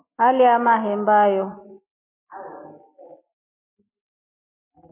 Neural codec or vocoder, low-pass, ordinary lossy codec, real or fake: none; 3.6 kHz; MP3, 24 kbps; real